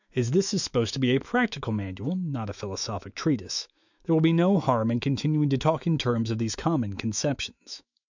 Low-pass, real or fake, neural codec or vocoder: 7.2 kHz; fake; autoencoder, 48 kHz, 128 numbers a frame, DAC-VAE, trained on Japanese speech